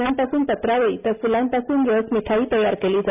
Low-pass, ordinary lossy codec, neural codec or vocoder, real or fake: 3.6 kHz; none; none; real